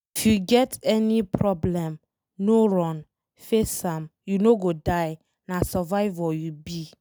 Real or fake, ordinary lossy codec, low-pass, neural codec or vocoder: real; none; none; none